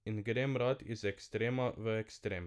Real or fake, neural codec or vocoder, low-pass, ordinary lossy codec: real; none; none; none